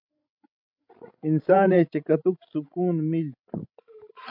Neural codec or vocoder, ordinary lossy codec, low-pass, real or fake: codec, 16 kHz, 16 kbps, FreqCodec, larger model; MP3, 48 kbps; 5.4 kHz; fake